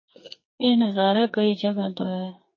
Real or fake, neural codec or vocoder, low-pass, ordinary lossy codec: fake; codec, 44.1 kHz, 2.6 kbps, SNAC; 7.2 kHz; MP3, 32 kbps